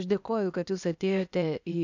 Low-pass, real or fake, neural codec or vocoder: 7.2 kHz; fake; codec, 16 kHz, 0.8 kbps, ZipCodec